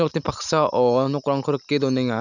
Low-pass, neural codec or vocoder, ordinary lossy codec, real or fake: 7.2 kHz; none; none; real